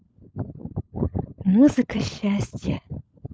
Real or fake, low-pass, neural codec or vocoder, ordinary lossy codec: fake; none; codec, 16 kHz, 16 kbps, FunCodec, trained on LibriTTS, 50 frames a second; none